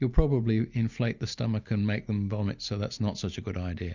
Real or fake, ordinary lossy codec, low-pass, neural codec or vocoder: real; Opus, 64 kbps; 7.2 kHz; none